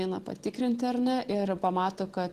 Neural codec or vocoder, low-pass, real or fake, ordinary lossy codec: none; 14.4 kHz; real; Opus, 16 kbps